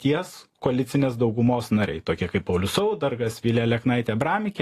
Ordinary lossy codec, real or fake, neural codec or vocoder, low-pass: AAC, 48 kbps; real; none; 14.4 kHz